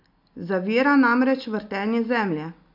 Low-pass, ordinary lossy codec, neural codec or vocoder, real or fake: 5.4 kHz; MP3, 48 kbps; none; real